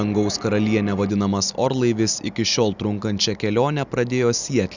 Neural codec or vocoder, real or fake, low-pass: none; real; 7.2 kHz